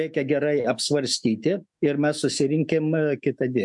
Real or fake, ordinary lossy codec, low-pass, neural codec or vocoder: fake; MP3, 64 kbps; 10.8 kHz; autoencoder, 48 kHz, 128 numbers a frame, DAC-VAE, trained on Japanese speech